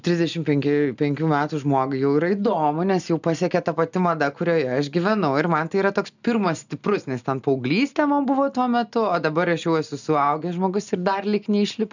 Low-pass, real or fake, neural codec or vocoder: 7.2 kHz; real; none